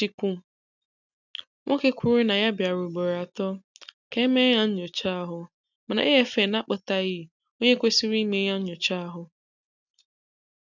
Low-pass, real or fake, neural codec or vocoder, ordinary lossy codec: 7.2 kHz; real; none; none